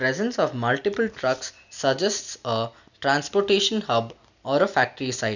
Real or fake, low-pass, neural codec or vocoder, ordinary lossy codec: real; 7.2 kHz; none; none